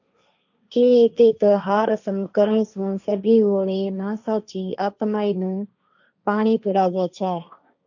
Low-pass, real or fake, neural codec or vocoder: 7.2 kHz; fake; codec, 16 kHz, 1.1 kbps, Voila-Tokenizer